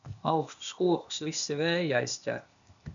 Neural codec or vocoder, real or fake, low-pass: codec, 16 kHz, 0.8 kbps, ZipCodec; fake; 7.2 kHz